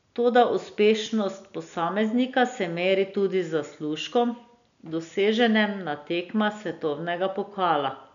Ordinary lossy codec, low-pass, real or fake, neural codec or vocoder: none; 7.2 kHz; real; none